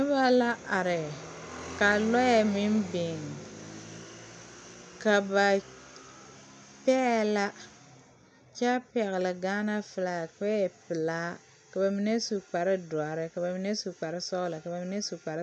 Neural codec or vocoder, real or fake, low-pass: none; real; 9.9 kHz